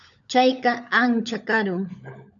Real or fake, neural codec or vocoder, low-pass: fake; codec, 16 kHz, 16 kbps, FunCodec, trained on LibriTTS, 50 frames a second; 7.2 kHz